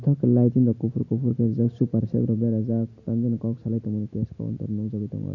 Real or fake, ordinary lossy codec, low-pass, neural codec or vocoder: real; none; 7.2 kHz; none